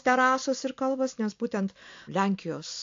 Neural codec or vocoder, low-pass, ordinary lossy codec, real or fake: none; 7.2 kHz; AAC, 48 kbps; real